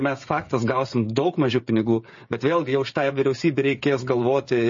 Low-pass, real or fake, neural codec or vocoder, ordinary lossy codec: 7.2 kHz; fake; codec, 16 kHz, 16 kbps, FreqCodec, smaller model; MP3, 32 kbps